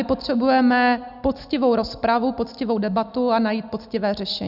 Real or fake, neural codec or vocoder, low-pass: real; none; 5.4 kHz